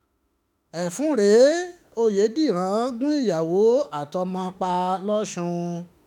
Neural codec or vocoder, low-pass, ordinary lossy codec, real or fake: autoencoder, 48 kHz, 32 numbers a frame, DAC-VAE, trained on Japanese speech; 19.8 kHz; none; fake